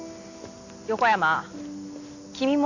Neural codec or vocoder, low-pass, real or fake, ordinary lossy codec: none; 7.2 kHz; real; none